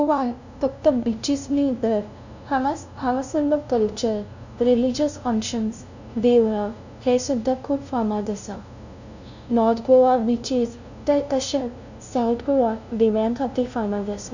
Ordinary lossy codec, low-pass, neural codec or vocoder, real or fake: none; 7.2 kHz; codec, 16 kHz, 0.5 kbps, FunCodec, trained on LibriTTS, 25 frames a second; fake